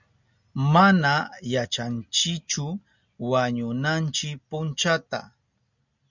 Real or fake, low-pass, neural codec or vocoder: real; 7.2 kHz; none